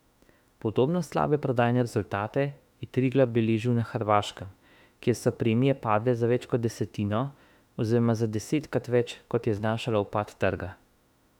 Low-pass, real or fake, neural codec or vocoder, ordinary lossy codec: 19.8 kHz; fake; autoencoder, 48 kHz, 32 numbers a frame, DAC-VAE, trained on Japanese speech; none